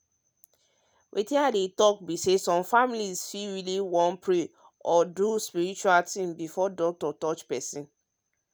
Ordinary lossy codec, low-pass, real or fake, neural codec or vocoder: none; none; real; none